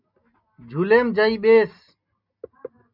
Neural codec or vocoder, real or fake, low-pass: none; real; 5.4 kHz